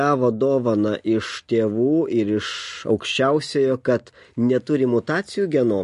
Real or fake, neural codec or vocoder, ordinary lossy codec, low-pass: real; none; MP3, 48 kbps; 10.8 kHz